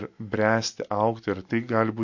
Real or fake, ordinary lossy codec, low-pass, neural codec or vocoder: real; MP3, 48 kbps; 7.2 kHz; none